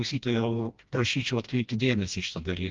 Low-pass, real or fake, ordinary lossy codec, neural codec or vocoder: 7.2 kHz; fake; Opus, 32 kbps; codec, 16 kHz, 1 kbps, FreqCodec, smaller model